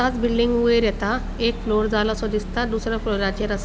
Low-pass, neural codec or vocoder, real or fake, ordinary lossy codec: none; none; real; none